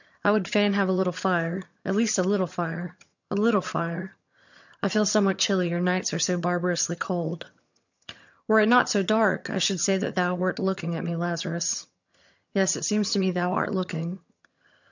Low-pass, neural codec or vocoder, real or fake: 7.2 kHz; vocoder, 22.05 kHz, 80 mel bands, HiFi-GAN; fake